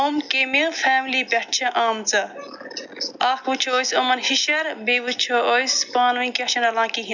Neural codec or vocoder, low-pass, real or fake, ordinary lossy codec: none; 7.2 kHz; real; none